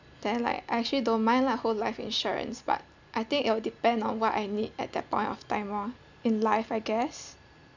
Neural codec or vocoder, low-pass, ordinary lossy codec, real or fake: none; 7.2 kHz; none; real